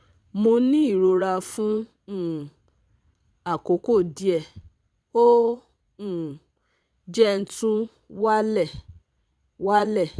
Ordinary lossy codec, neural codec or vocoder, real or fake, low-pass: none; vocoder, 22.05 kHz, 80 mel bands, Vocos; fake; none